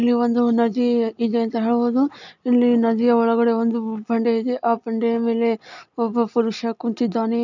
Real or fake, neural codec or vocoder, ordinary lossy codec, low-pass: real; none; none; 7.2 kHz